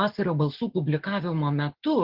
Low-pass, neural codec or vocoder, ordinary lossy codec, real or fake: 5.4 kHz; none; Opus, 16 kbps; real